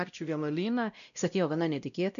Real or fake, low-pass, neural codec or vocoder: fake; 7.2 kHz; codec, 16 kHz, 0.5 kbps, X-Codec, WavLM features, trained on Multilingual LibriSpeech